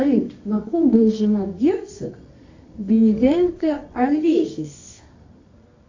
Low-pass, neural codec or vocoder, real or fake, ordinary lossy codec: 7.2 kHz; codec, 24 kHz, 0.9 kbps, WavTokenizer, medium music audio release; fake; AAC, 48 kbps